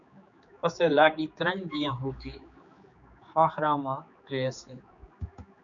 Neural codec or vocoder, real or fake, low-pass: codec, 16 kHz, 4 kbps, X-Codec, HuBERT features, trained on general audio; fake; 7.2 kHz